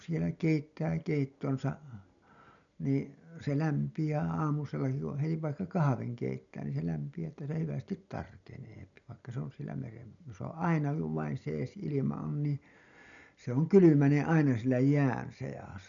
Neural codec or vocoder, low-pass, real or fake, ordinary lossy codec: none; 7.2 kHz; real; none